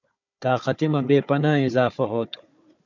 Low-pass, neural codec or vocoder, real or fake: 7.2 kHz; codec, 16 kHz, 4 kbps, FunCodec, trained on Chinese and English, 50 frames a second; fake